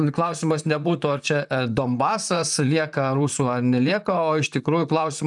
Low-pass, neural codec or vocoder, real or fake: 10.8 kHz; vocoder, 44.1 kHz, 128 mel bands, Pupu-Vocoder; fake